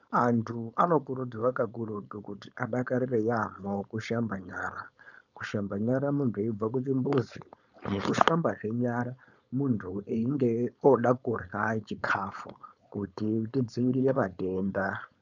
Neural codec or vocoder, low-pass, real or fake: codec, 16 kHz, 4.8 kbps, FACodec; 7.2 kHz; fake